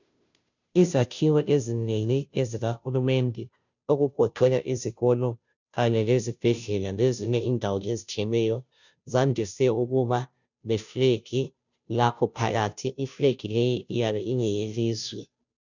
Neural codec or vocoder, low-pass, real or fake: codec, 16 kHz, 0.5 kbps, FunCodec, trained on Chinese and English, 25 frames a second; 7.2 kHz; fake